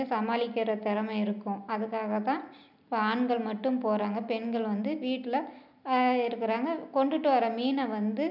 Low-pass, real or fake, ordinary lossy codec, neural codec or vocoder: 5.4 kHz; real; none; none